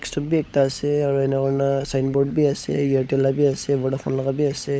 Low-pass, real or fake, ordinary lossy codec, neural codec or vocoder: none; fake; none; codec, 16 kHz, 16 kbps, FunCodec, trained on LibriTTS, 50 frames a second